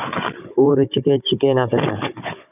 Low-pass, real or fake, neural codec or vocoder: 3.6 kHz; fake; codec, 16 kHz, 16 kbps, FunCodec, trained on Chinese and English, 50 frames a second